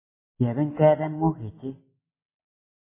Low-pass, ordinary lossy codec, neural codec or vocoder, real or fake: 3.6 kHz; MP3, 16 kbps; none; real